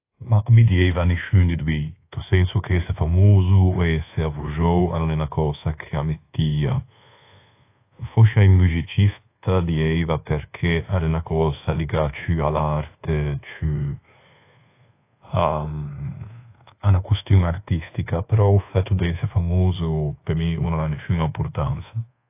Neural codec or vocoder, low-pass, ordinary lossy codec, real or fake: codec, 24 kHz, 1.2 kbps, DualCodec; 3.6 kHz; AAC, 24 kbps; fake